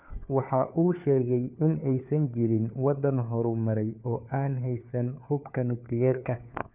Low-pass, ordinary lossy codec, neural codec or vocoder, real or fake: 3.6 kHz; none; codec, 16 kHz, 4 kbps, FunCodec, trained on LibriTTS, 50 frames a second; fake